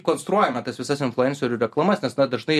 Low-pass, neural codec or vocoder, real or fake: 14.4 kHz; none; real